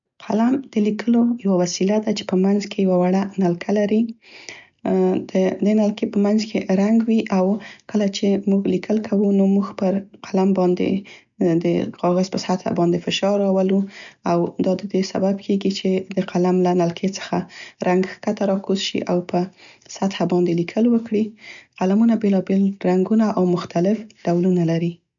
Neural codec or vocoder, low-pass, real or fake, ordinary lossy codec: none; 7.2 kHz; real; none